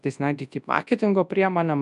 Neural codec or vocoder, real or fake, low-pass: codec, 24 kHz, 0.9 kbps, WavTokenizer, large speech release; fake; 10.8 kHz